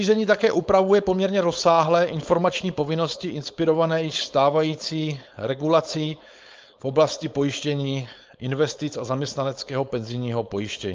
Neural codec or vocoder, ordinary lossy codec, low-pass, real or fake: codec, 16 kHz, 4.8 kbps, FACodec; Opus, 24 kbps; 7.2 kHz; fake